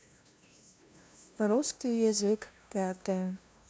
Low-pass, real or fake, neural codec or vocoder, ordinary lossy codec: none; fake; codec, 16 kHz, 0.5 kbps, FunCodec, trained on LibriTTS, 25 frames a second; none